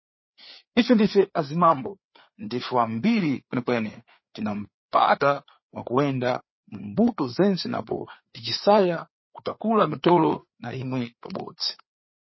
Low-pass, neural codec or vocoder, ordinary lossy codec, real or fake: 7.2 kHz; codec, 16 kHz, 4 kbps, FreqCodec, larger model; MP3, 24 kbps; fake